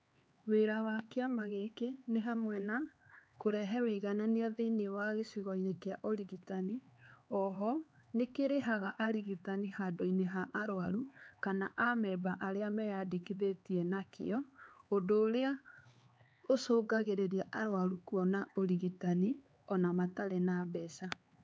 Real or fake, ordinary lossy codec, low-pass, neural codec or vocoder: fake; none; none; codec, 16 kHz, 4 kbps, X-Codec, HuBERT features, trained on LibriSpeech